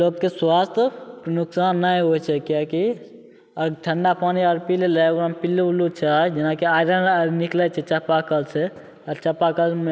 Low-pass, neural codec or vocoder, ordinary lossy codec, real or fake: none; none; none; real